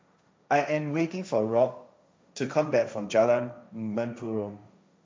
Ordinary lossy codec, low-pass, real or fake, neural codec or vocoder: none; none; fake; codec, 16 kHz, 1.1 kbps, Voila-Tokenizer